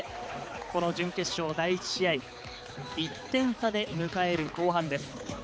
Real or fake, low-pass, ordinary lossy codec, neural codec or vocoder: fake; none; none; codec, 16 kHz, 4 kbps, X-Codec, HuBERT features, trained on balanced general audio